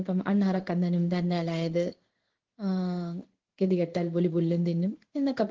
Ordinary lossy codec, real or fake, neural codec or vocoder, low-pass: Opus, 16 kbps; fake; codec, 16 kHz in and 24 kHz out, 1 kbps, XY-Tokenizer; 7.2 kHz